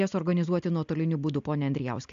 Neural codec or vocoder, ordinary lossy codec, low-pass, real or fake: none; AAC, 64 kbps; 7.2 kHz; real